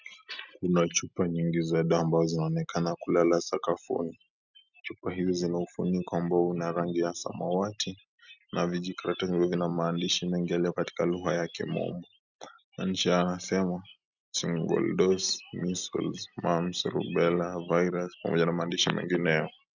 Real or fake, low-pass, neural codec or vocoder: real; 7.2 kHz; none